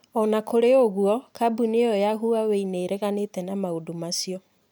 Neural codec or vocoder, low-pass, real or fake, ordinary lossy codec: none; none; real; none